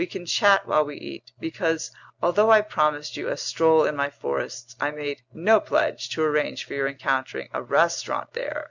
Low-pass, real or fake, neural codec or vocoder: 7.2 kHz; real; none